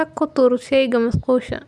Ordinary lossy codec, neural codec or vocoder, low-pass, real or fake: none; none; none; real